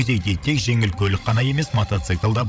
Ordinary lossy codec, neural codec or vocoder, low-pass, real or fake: none; codec, 16 kHz, 16 kbps, FreqCodec, larger model; none; fake